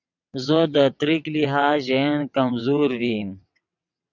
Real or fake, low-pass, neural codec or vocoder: fake; 7.2 kHz; vocoder, 22.05 kHz, 80 mel bands, WaveNeXt